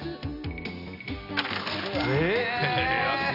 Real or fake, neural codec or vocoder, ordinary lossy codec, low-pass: real; none; none; 5.4 kHz